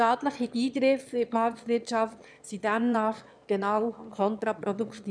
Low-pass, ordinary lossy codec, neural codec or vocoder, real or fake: 9.9 kHz; none; autoencoder, 22.05 kHz, a latent of 192 numbers a frame, VITS, trained on one speaker; fake